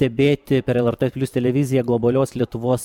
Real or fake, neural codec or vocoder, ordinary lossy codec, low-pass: fake; vocoder, 44.1 kHz, 128 mel bands every 512 samples, BigVGAN v2; MP3, 96 kbps; 19.8 kHz